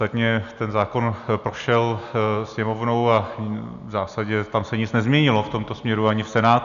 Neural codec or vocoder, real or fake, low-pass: none; real; 7.2 kHz